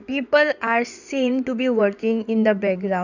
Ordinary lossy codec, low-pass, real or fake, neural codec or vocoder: none; 7.2 kHz; fake; codec, 16 kHz in and 24 kHz out, 2.2 kbps, FireRedTTS-2 codec